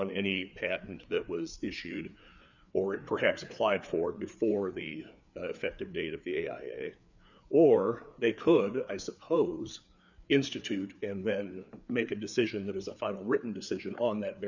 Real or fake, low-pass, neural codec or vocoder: fake; 7.2 kHz; codec, 16 kHz, 4 kbps, FreqCodec, larger model